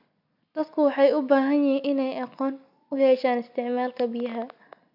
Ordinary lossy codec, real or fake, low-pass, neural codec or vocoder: none; real; 5.4 kHz; none